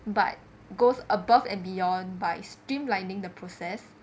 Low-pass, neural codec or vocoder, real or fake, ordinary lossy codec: none; none; real; none